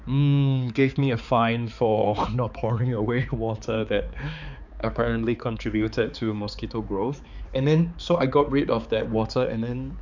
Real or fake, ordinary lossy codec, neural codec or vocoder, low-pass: fake; none; codec, 16 kHz, 4 kbps, X-Codec, HuBERT features, trained on balanced general audio; 7.2 kHz